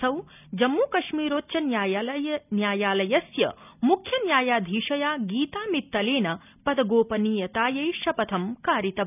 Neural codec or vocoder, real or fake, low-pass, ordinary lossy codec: none; real; 3.6 kHz; none